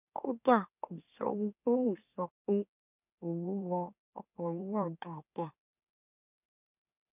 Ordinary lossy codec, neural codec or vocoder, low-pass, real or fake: none; autoencoder, 44.1 kHz, a latent of 192 numbers a frame, MeloTTS; 3.6 kHz; fake